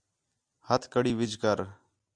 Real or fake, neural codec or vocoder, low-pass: real; none; 9.9 kHz